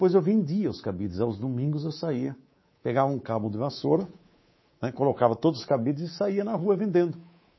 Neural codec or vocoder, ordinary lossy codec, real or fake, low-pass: codec, 24 kHz, 3.1 kbps, DualCodec; MP3, 24 kbps; fake; 7.2 kHz